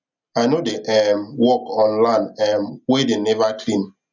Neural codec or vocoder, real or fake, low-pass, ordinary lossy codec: none; real; 7.2 kHz; none